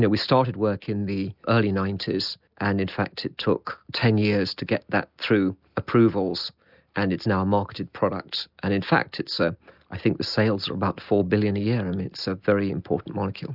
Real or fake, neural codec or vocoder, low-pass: real; none; 5.4 kHz